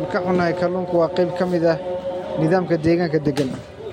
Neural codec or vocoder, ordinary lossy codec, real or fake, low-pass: none; MP3, 64 kbps; real; 19.8 kHz